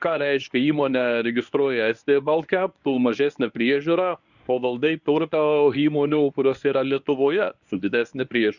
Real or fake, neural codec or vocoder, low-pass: fake; codec, 24 kHz, 0.9 kbps, WavTokenizer, medium speech release version 1; 7.2 kHz